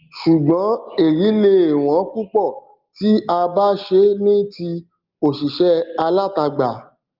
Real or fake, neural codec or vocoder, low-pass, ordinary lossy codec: real; none; 5.4 kHz; Opus, 32 kbps